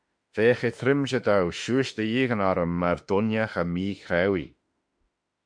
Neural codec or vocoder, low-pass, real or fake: autoencoder, 48 kHz, 32 numbers a frame, DAC-VAE, trained on Japanese speech; 9.9 kHz; fake